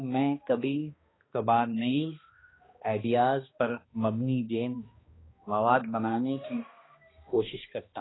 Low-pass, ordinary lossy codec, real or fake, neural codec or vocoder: 7.2 kHz; AAC, 16 kbps; fake; codec, 16 kHz, 1 kbps, X-Codec, HuBERT features, trained on balanced general audio